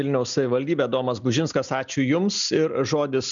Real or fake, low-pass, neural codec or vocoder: real; 7.2 kHz; none